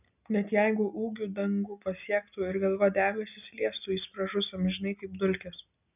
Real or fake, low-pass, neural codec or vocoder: real; 3.6 kHz; none